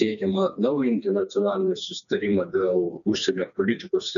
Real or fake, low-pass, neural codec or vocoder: fake; 7.2 kHz; codec, 16 kHz, 2 kbps, FreqCodec, smaller model